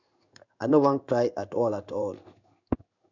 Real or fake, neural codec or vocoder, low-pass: fake; codec, 16 kHz in and 24 kHz out, 1 kbps, XY-Tokenizer; 7.2 kHz